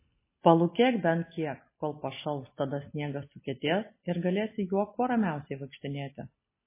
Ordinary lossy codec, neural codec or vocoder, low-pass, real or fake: MP3, 16 kbps; none; 3.6 kHz; real